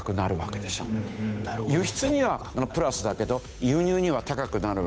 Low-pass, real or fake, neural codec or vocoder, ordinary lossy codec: none; fake; codec, 16 kHz, 8 kbps, FunCodec, trained on Chinese and English, 25 frames a second; none